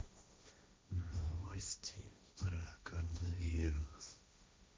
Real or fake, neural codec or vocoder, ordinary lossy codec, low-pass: fake; codec, 16 kHz, 1.1 kbps, Voila-Tokenizer; none; none